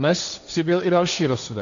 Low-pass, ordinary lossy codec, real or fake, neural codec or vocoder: 7.2 kHz; MP3, 96 kbps; fake; codec, 16 kHz, 1.1 kbps, Voila-Tokenizer